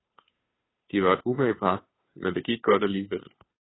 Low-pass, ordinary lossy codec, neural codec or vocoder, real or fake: 7.2 kHz; AAC, 16 kbps; codec, 16 kHz, 2 kbps, FunCodec, trained on Chinese and English, 25 frames a second; fake